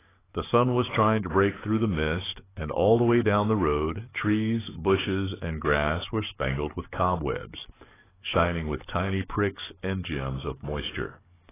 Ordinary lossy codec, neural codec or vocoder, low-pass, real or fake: AAC, 16 kbps; codec, 44.1 kHz, 7.8 kbps, DAC; 3.6 kHz; fake